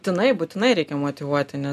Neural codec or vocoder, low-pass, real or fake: none; 14.4 kHz; real